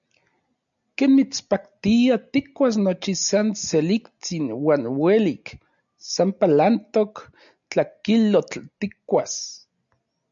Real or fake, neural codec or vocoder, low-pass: real; none; 7.2 kHz